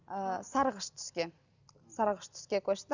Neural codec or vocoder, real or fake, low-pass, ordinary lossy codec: none; real; 7.2 kHz; none